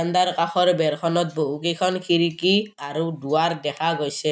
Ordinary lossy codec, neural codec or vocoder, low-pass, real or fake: none; none; none; real